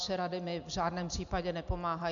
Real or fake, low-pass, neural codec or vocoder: real; 7.2 kHz; none